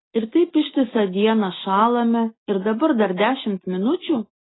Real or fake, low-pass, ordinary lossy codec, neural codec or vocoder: real; 7.2 kHz; AAC, 16 kbps; none